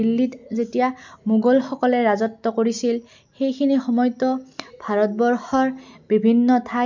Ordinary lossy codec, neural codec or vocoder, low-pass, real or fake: MP3, 64 kbps; none; 7.2 kHz; real